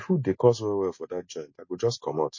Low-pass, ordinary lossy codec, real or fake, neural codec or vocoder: 7.2 kHz; MP3, 32 kbps; real; none